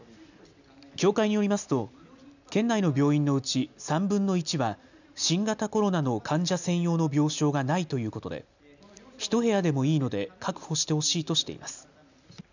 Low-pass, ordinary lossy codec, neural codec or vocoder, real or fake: 7.2 kHz; none; none; real